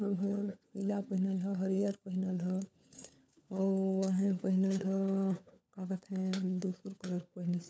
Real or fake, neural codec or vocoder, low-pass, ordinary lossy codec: fake; codec, 16 kHz, 4 kbps, FunCodec, trained on LibriTTS, 50 frames a second; none; none